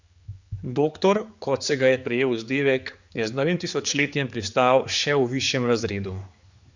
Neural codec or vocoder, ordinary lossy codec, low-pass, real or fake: codec, 16 kHz, 4 kbps, X-Codec, HuBERT features, trained on general audio; Opus, 64 kbps; 7.2 kHz; fake